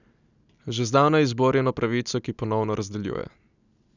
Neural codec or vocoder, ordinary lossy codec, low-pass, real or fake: none; none; 7.2 kHz; real